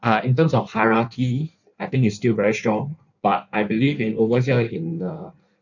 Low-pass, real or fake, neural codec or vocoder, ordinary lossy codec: 7.2 kHz; fake; codec, 16 kHz in and 24 kHz out, 1.1 kbps, FireRedTTS-2 codec; none